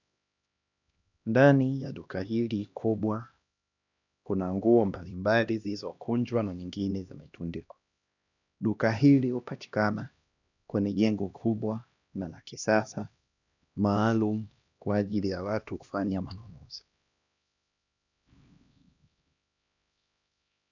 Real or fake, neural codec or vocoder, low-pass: fake; codec, 16 kHz, 1 kbps, X-Codec, HuBERT features, trained on LibriSpeech; 7.2 kHz